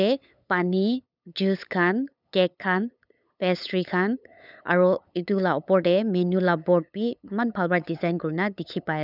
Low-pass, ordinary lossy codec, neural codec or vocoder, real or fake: 5.4 kHz; none; codec, 16 kHz, 8 kbps, FunCodec, trained on LibriTTS, 25 frames a second; fake